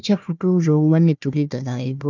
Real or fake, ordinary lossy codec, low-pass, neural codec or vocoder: fake; none; 7.2 kHz; codec, 16 kHz, 1 kbps, FunCodec, trained on Chinese and English, 50 frames a second